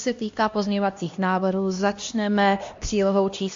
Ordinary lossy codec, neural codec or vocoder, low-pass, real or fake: AAC, 48 kbps; codec, 16 kHz, 1 kbps, X-Codec, HuBERT features, trained on LibriSpeech; 7.2 kHz; fake